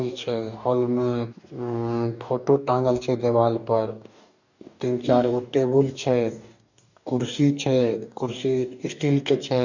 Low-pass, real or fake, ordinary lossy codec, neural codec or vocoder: 7.2 kHz; fake; none; codec, 44.1 kHz, 2.6 kbps, DAC